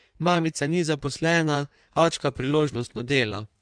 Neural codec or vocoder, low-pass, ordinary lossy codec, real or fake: codec, 16 kHz in and 24 kHz out, 1.1 kbps, FireRedTTS-2 codec; 9.9 kHz; MP3, 96 kbps; fake